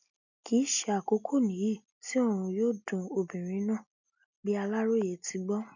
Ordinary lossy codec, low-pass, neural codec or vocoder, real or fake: none; 7.2 kHz; none; real